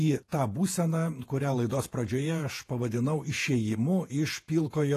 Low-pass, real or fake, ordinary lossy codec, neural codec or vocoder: 14.4 kHz; fake; AAC, 48 kbps; vocoder, 48 kHz, 128 mel bands, Vocos